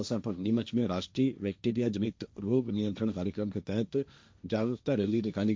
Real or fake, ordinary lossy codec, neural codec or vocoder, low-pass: fake; none; codec, 16 kHz, 1.1 kbps, Voila-Tokenizer; none